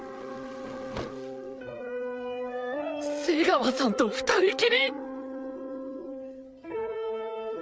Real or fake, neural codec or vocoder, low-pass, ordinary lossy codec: fake; codec, 16 kHz, 8 kbps, FreqCodec, larger model; none; none